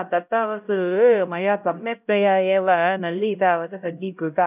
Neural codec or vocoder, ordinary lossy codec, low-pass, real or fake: codec, 16 kHz, 0.5 kbps, X-Codec, HuBERT features, trained on LibriSpeech; none; 3.6 kHz; fake